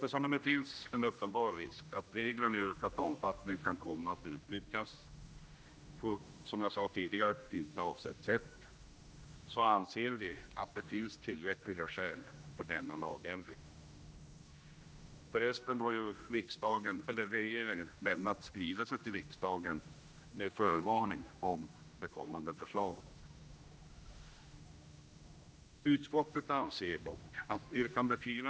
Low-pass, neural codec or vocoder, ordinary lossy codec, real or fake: none; codec, 16 kHz, 1 kbps, X-Codec, HuBERT features, trained on general audio; none; fake